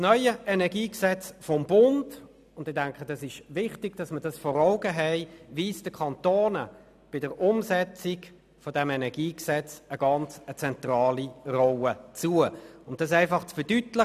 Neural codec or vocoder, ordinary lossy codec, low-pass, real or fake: none; none; 14.4 kHz; real